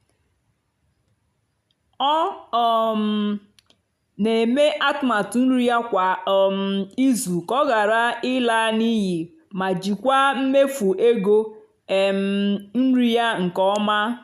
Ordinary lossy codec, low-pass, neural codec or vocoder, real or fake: none; 14.4 kHz; none; real